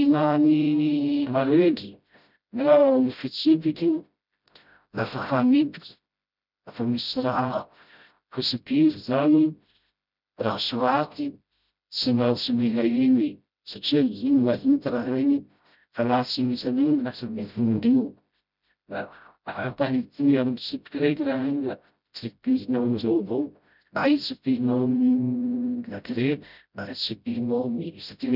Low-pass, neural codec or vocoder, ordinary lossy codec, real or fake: 5.4 kHz; codec, 16 kHz, 0.5 kbps, FreqCodec, smaller model; none; fake